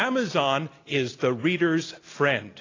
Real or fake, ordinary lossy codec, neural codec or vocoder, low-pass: real; AAC, 32 kbps; none; 7.2 kHz